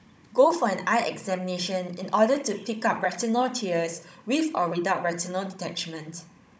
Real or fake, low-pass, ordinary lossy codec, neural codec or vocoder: fake; none; none; codec, 16 kHz, 16 kbps, FunCodec, trained on Chinese and English, 50 frames a second